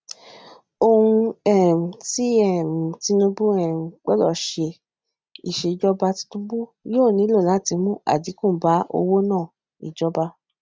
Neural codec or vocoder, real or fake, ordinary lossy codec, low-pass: none; real; none; none